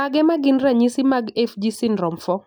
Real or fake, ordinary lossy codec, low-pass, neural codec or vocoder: real; none; none; none